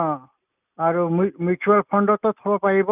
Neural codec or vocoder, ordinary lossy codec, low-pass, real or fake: none; none; 3.6 kHz; real